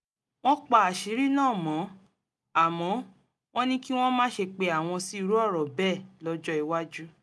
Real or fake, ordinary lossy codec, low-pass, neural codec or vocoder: real; none; none; none